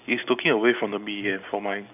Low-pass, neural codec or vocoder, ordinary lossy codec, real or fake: 3.6 kHz; none; none; real